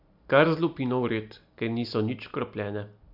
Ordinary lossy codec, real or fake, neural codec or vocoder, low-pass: MP3, 48 kbps; real; none; 5.4 kHz